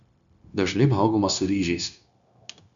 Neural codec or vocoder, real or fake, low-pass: codec, 16 kHz, 0.9 kbps, LongCat-Audio-Codec; fake; 7.2 kHz